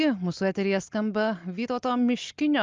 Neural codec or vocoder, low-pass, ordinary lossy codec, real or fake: none; 7.2 kHz; Opus, 24 kbps; real